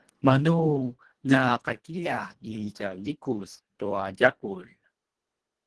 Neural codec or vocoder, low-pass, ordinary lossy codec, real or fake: codec, 24 kHz, 1.5 kbps, HILCodec; 10.8 kHz; Opus, 16 kbps; fake